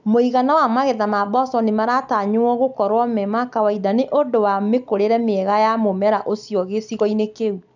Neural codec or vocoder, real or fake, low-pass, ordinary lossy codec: none; real; 7.2 kHz; none